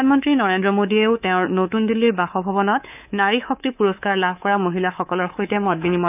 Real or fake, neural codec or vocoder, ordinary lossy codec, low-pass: fake; codec, 24 kHz, 3.1 kbps, DualCodec; none; 3.6 kHz